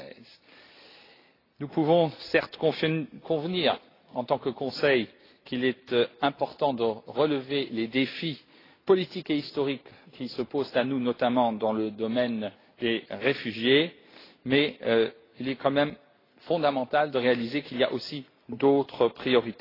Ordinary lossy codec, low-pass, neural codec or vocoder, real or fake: AAC, 24 kbps; 5.4 kHz; none; real